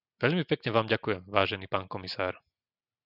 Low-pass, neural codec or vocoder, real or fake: 5.4 kHz; none; real